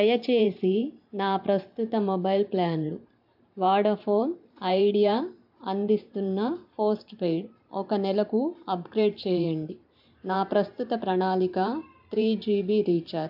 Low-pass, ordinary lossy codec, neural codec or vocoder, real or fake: 5.4 kHz; AAC, 48 kbps; vocoder, 44.1 kHz, 128 mel bands every 512 samples, BigVGAN v2; fake